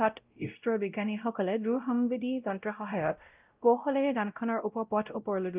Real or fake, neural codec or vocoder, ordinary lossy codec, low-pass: fake; codec, 16 kHz, 0.5 kbps, X-Codec, WavLM features, trained on Multilingual LibriSpeech; Opus, 24 kbps; 3.6 kHz